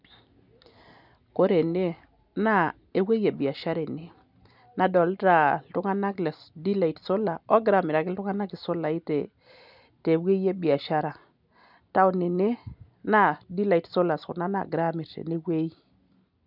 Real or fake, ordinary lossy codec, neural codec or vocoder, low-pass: real; none; none; 5.4 kHz